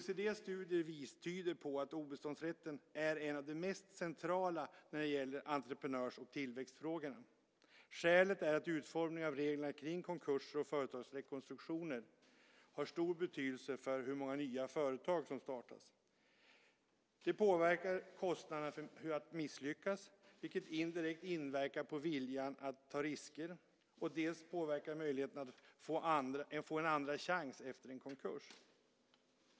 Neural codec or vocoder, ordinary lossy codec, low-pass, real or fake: none; none; none; real